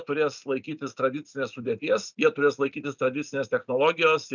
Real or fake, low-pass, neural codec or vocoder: real; 7.2 kHz; none